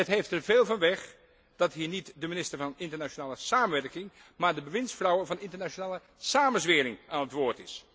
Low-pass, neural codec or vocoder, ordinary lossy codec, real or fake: none; none; none; real